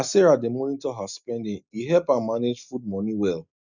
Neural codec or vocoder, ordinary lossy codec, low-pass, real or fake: none; none; 7.2 kHz; real